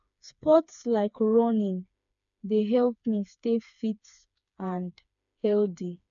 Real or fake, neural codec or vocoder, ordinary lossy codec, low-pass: fake; codec, 16 kHz, 4 kbps, FreqCodec, smaller model; none; 7.2 kHz